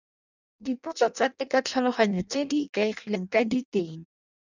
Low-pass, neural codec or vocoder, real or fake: 7.2 kHz; codec, 16 kHz in and 24 kHz out, 0.6 kbps, FireRedTTS-2 codec; fake